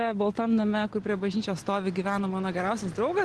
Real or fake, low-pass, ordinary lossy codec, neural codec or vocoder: real; 10.8 kHz; Opus, 16 kbps; none